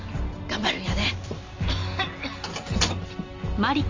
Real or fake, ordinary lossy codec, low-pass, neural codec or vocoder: real; MP3, 64 kbps; 7.2 kHz; none